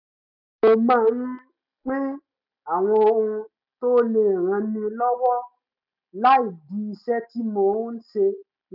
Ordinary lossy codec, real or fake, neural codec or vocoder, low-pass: none; real; none; 5.4 kHz